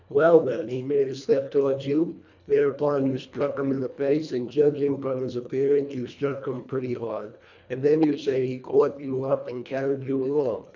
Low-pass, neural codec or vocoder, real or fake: 7.2 kHz; codec, 24 kHz, 1.5 kbps, HILCodec; fake